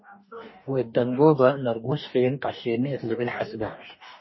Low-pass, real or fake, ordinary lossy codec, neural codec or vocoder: 7.2 kHz; fake; MP3, 24 kbps; codec, 44.1 kHz, 2.6 kbps, DAC